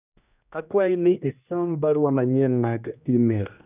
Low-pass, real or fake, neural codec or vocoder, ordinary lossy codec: 3.6 kHz; fake; codec, 16 kHz, 1 kbps, X-Codec, HuBERT features, trained on general audio; none